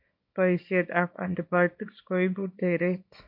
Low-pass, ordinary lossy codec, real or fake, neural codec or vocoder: 5.4 kHz; AAC, 48 kbps; fake; codec, 24 kHz, 0.9 kbps, WavTokenizer, small release